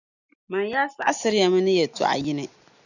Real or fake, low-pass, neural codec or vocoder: real; 7.2 kHz; none